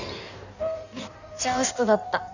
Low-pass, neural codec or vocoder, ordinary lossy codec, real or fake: 7.2 kHz; codec, 16 kHz in and 24 kHz out, 1.1 kbps, FireRedTTS-2 codec; none; fake